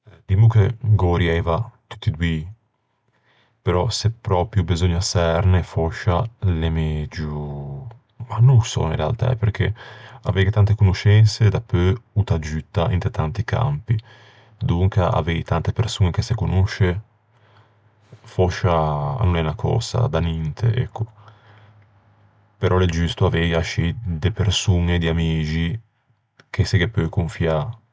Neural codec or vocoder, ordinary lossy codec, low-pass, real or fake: none; none; none; real